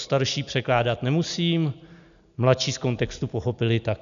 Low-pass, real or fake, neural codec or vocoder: 7.2 kHz; real; none